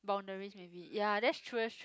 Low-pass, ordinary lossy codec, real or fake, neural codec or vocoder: none; none; real; none